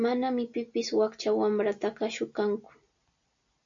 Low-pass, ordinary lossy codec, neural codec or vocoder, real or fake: 7.2 kHz; AAC, 64 kbps; none; real